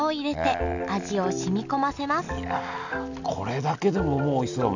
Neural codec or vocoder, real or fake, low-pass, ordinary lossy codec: none; real; 7.2 kHz; none